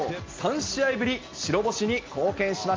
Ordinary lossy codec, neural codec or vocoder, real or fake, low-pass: Opus, 24 kbps; none; real; 7.2 kHz